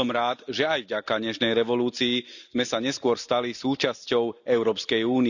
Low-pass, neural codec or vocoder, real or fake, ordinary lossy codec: 7.2 kHz; none; real; none